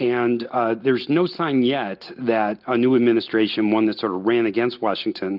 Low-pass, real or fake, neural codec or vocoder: 5.4 kHz; real; none